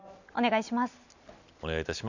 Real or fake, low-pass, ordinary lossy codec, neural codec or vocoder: real; 7.2 kHz; none; none